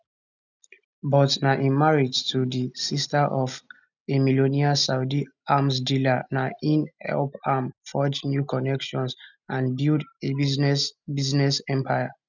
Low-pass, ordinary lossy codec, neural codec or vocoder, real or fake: 7.2 kHz; none; none; real